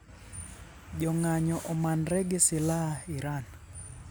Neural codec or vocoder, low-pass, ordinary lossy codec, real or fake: none; none; none; real